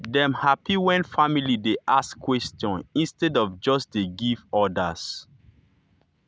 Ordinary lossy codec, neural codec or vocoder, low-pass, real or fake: none; none; none; real